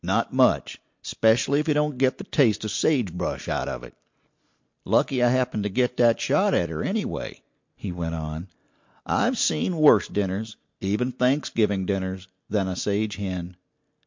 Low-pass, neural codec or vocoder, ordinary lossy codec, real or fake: 7.2 kHz; none; MP3, 48 kbps; real